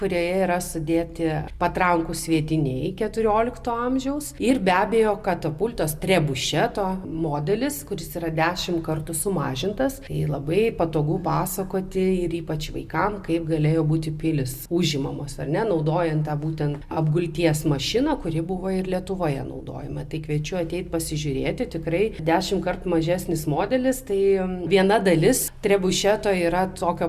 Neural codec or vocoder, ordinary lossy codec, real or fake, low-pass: none; Opus, 64 kbps; real; 14.4 kHz